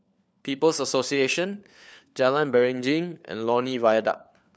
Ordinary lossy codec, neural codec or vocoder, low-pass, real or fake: none; codec, 16 kHz, 4 kbps, FunCodec, trained on LibriTTS, 50 frames a second; none; fake